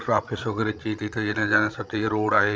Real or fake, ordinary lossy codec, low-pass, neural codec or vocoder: fake; none; none; codec, 16 kHz, 16 kbps, FreqCodec, larger model